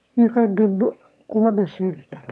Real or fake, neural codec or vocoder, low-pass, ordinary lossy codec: fake; autoencoder, 22.05 kHz, a latent of 192 numbers a frame, VITS, trained on one speaker; none; none